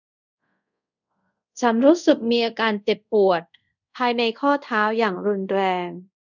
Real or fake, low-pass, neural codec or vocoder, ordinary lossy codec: fake; 7.2 kHz; codec, 24 kHz, 0.5 kbps, DualCodec; none